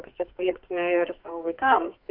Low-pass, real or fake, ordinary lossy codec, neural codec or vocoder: 5.4 kHz; fake; AAC, 48 kbps; codec, 44.1 kHz, 2.6 kbps, SNAC